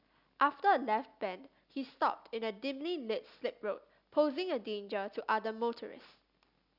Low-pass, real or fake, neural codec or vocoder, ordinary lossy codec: 5.4 kHz; real; none; Opus, 64 kbps